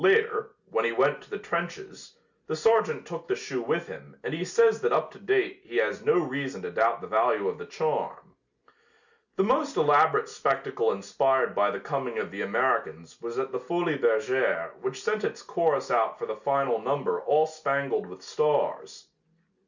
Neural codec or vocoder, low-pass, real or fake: none; 7.2 kHz; real